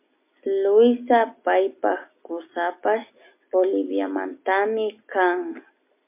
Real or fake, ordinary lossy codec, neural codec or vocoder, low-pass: real; MP3, 24 kbps; none; 3.6 kHz